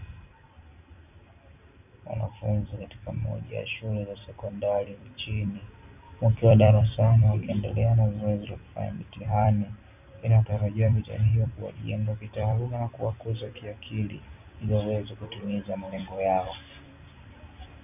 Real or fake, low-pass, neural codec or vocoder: fake; 3.6 kHz; vocoder, 44.1 kHz, 128 mel bands every 256 samples, BigVGAN v2